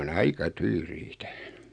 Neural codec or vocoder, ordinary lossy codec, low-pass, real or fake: none; Opus, 64 kbps; 9.9 kHz; real